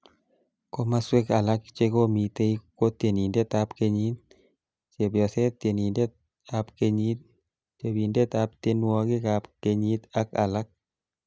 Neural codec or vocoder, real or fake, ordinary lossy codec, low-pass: none; real; none; none